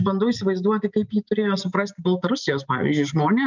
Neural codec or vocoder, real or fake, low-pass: none; real; 7.2 kHz